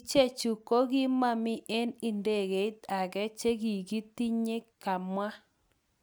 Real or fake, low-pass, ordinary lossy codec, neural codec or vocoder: real; none; none; none